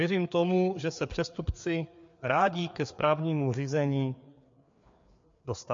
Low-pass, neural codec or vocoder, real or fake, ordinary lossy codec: 7.2 kHz; codec, 16 kHz, 4 kbps, FreqCodec, larger model; fake; MP3, 48 kbps